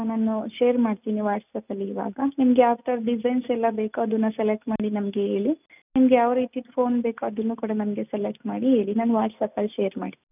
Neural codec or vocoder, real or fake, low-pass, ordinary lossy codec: none; real; 3.6 kHz; none